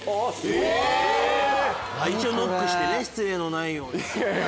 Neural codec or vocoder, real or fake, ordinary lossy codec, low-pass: none; real; none; none